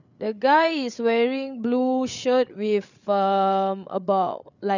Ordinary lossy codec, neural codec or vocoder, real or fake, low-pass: none; codec, 16 kHz, 8 kbps, FreqCodec, larger model; fake; 7.2 kHz